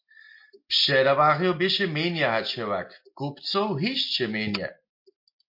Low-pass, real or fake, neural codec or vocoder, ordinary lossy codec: 5.4 kHz; real; none; MP3, 32 kbps